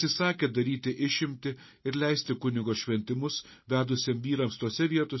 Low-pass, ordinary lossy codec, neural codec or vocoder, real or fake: 7.2 kHz; MP3, 24 kbps; none; real